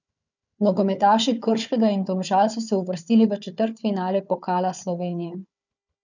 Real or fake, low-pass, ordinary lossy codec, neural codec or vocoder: fake; 7.2 kHz; none; codec, 16 kHz, 4 kbps, FunCodec, trained on Chinese and English, 50 frames a second